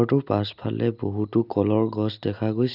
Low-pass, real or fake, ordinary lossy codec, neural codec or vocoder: 5.4 kHz; real; none; none